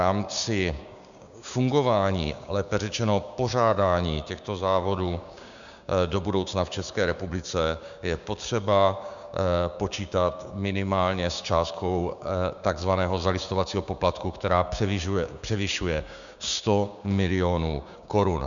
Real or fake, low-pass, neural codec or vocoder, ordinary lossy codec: fake; 7.2 kHz; codec, 16 kHz, 6 kbps, DAC; MP3, 96 kbps